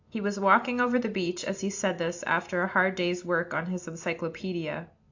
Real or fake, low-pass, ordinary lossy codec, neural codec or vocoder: real; 7.2 kHz; AAC, 48 kbps; none